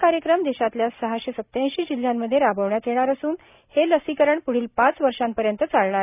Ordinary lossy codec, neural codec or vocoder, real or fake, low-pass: none; none; real; 3.6 kHz